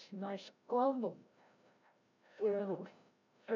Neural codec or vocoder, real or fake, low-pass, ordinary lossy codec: codec, 16 kHz, 0.5 kbps, FreqCodec, larger model; fake; 7.2 kHz; none